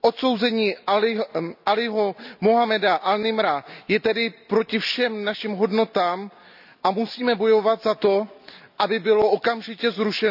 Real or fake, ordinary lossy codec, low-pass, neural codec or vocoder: real; none; 5.4 kHz; none